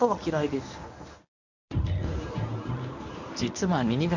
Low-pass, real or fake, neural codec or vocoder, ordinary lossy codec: 7.2 kHz; fake; codec, 24 kHz, 0.9 kbps, WavTokenizer, medium speech release version 1; none